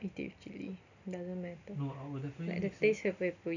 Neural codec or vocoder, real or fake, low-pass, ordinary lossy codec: none; real; 7.2 kHz; none